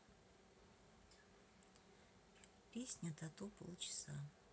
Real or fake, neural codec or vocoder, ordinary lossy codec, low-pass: real; none; none; none